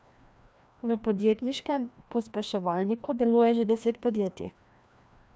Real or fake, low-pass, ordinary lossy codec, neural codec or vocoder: fake; none; none; codec, 16 kHz, 1 kbps, FreqCodec, larger model